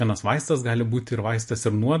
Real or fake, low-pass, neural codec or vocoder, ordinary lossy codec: real; 14.4 kHz; none; MP3, 48 kbps